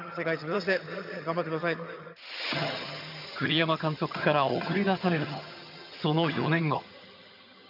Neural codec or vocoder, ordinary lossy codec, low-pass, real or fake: vocoder, 22.05 kHz, 80 mel bands, HiFi-GAN; none; 5.4 kHz; fake